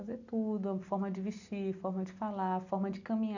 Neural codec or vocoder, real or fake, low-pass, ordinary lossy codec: none; real; 7.2 kHz; none